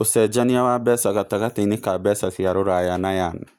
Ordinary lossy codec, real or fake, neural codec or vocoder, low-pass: none; real; none; none